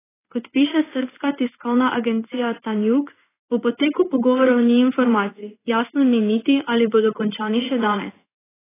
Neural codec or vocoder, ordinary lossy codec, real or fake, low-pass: codec, 16 kHz in and 24 kHz out, 1 kbps, XY-Tokenizer; AAC, 16 kbps; fake; 3.6 kHz